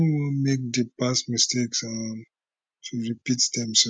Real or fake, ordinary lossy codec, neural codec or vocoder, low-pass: real; none; none; 9.9 kHz